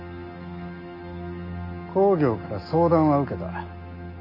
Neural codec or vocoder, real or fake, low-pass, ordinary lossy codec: none; real; 5.4 kHz; none